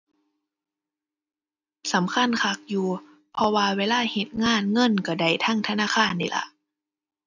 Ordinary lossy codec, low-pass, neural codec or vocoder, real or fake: none; 7.2 kHz; none; real